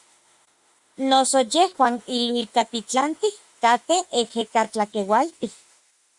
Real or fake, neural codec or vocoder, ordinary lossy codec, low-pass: fake; autoencoder, 48 kHz, 32 numbers a frame, DAC-VAE, trained on Japanese speech; Opus, 64 kbps; 10.8 kHz